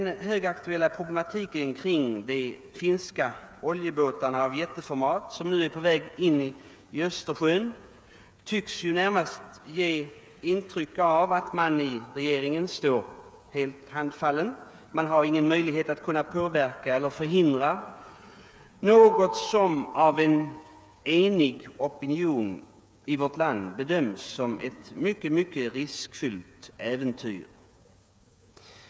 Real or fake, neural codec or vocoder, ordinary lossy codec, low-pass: fake; codec, 16 kHz, 8 kbps, FreqCodec, smaller model; none; none